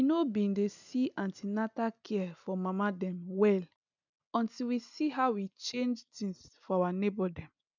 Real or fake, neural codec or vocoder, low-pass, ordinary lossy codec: real; none; 7.2 kHz; none